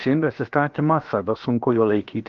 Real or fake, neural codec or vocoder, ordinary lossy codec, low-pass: fake; codec, 16 kHz, 0.7 kbps, FocalCodec; Opus, 32 kbps; 7.2 kHz